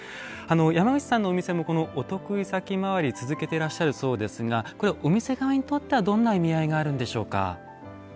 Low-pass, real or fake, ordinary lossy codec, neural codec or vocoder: none; real; none; none